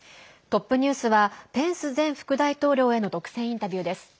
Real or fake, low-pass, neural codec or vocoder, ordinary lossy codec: real; none; none; none